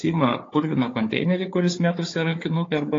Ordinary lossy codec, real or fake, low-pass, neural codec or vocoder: AAC, 32 kbps; fake; 7.2 kHz; codec, 16 kHz, 4 kbps, FunCodec, trained on Chinese and English, 50 frames a second